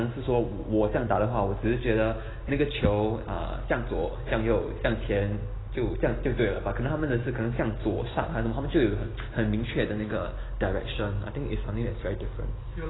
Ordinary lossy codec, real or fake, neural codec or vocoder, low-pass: AAC, 16 kbps; real; none; 7.2 kHz